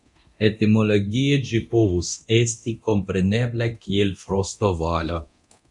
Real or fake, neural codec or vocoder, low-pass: fake; codec, 24 kHz, 0.9 kbps, DualCodec; 10.8 kHz